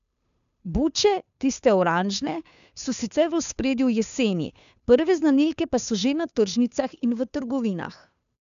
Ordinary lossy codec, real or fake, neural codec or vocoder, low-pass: none; fake; codec, 16 kHz, 2 kbps, FunCodec, trained on Chinese and English, 25 frames a second; 7.2 kHz